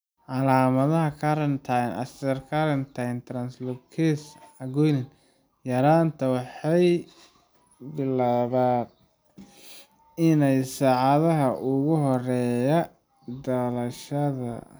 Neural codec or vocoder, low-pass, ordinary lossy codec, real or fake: none; none; none; real